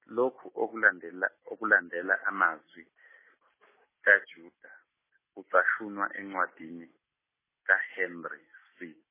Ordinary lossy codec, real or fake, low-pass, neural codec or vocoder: MP3, 16 kbps; real; 3.6 kHz; none